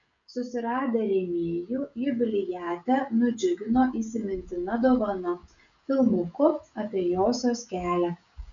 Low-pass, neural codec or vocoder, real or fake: 7.2 kHz; codec, 16 kHz, 16 kbps, FreqCodec, smaller model; fake